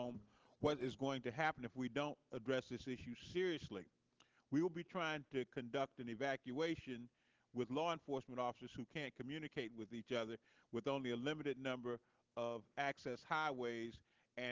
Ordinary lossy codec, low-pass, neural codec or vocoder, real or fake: Opus, 16 kbps; 7.2 kHz; none; real